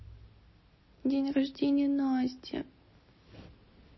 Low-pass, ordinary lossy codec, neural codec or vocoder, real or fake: 7.2 kHz; MP3, 24 kbps; none; real